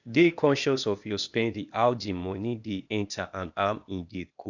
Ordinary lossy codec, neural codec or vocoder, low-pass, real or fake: none; codec, 16 kHz, 0.8 kbps, ZipCodec; 7.2 kHz; fake